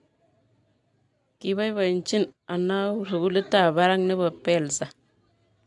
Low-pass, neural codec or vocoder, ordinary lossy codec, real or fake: 10.8 kHz; none; AAC, 64 kbps; real